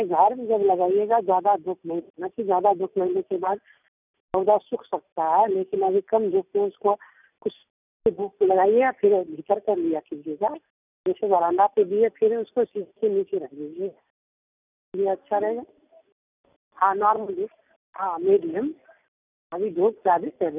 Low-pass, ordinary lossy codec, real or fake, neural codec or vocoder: 3.6 kHz; none; real; none